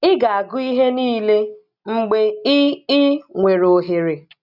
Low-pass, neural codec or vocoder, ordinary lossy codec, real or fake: 5.4 kHz; none; none; real